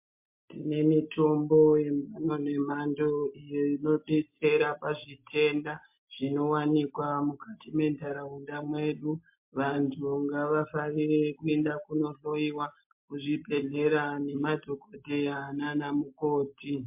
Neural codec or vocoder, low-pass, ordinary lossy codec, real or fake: none; 3.6 kHz; MP3, 32 kbps; real